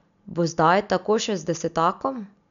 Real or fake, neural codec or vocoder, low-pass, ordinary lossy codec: real; none; 7.2 kHz; none